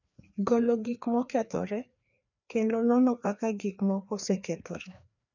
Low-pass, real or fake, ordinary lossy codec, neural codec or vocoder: 7.2 kHz; fake; none; codec, 16 kHz, 2 kbps, FreqCodec, larger model